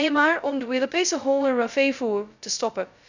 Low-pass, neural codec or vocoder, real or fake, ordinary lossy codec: 7.2 kHz; codec, 16 kHz, 0.2 kbps, FocalCodec; fake; none